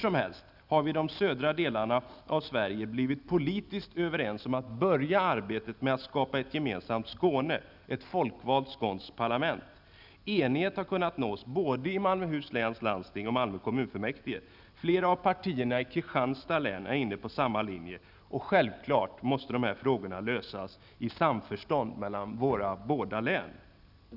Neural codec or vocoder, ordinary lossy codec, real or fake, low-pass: none; none; real; 5.4 kHz